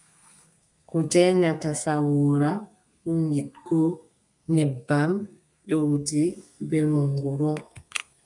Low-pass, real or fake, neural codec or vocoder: 10.8 kHz; fake; codec, 32 kHz, 1.9 kbps, SNAC